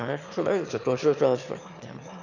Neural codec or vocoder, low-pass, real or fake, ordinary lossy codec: autoencoder, 22.05 kHz, a latent of 192 numbers a frame, VITS, trained on one speaker; 7.2 kHz; fake; none